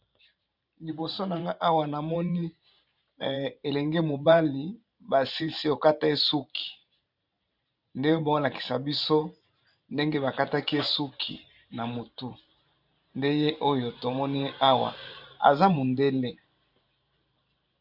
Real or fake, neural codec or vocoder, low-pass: fake; vocoder, 44.1 kHz, 128 mel bands every 512 samples, BigVGAN v2; 5.4 kHz